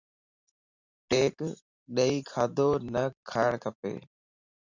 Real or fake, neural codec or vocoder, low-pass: fake; vocoder, 44.1 kHz, 128 mel bands every 512 samples, BigVGAN v2; 7.2 kHz